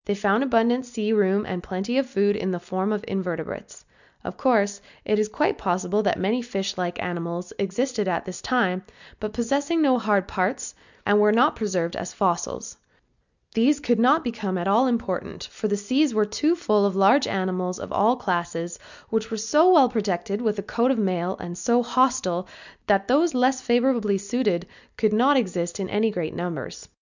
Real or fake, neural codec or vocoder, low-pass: real; none; 7.2 kHz